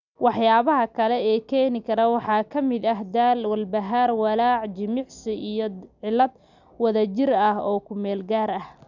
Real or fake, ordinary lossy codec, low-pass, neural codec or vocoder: real; none; 7.2 kHz; none